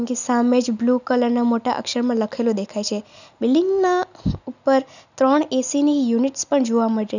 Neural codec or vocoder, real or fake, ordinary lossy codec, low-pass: none; real; none; 7.2 kHz